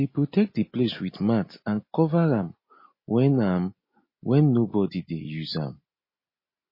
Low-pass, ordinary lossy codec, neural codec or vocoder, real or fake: 5.4 kHz; MP3, 24 kbps; none; real